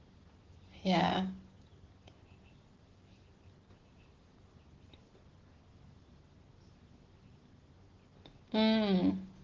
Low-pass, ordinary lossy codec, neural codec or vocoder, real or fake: 7.2 kHz; Opus, 16 kbps; none; real